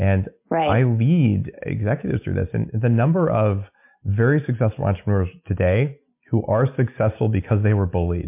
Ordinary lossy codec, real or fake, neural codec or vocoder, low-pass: MP3, 32 kbps; real; none; 3.6 kHz